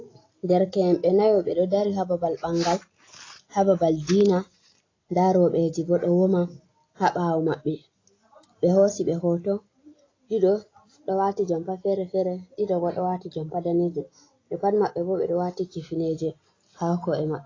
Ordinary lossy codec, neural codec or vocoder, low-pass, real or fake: AAC, 32 kbps; none; 7.2 kHz; real